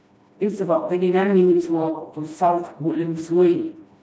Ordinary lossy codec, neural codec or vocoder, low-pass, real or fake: none; codec, 16 kHz, 1 kbps, FreqCodec, smaller model; none; fake